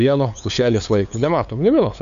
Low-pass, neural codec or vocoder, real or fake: 7.2 kHz; codec, 16 kHz, 2 kbps, FunCodec, trained on LibriTTS, 25 frames a second; fake